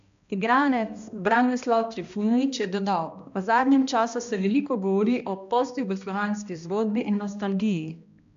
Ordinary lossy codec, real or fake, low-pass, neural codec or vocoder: MP3, 64 kbps; fake; 7.2 kHz; codec, 16 kHz, 1 kbps, X-Codec, HuBERT features, trained on balanced general audio